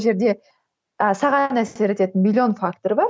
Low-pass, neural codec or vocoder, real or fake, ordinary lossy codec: none; none; real; none